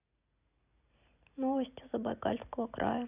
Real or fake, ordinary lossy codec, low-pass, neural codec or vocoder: real; none; 3.6 kHz; none